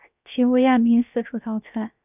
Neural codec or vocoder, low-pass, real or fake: codec, 16 kHz, 0.7 kbps, FocalCodec; 3.6 kHz; fake